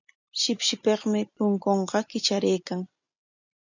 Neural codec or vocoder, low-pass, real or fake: none; 7.2 kHz; real